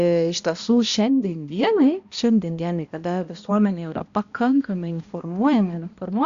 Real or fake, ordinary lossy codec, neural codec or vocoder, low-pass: fake; Opus, 64 kbps; codec, 16 kHz, 1 kbps, X-Codec, HuBERT features, trained on balanced general audio; 7.2 kHz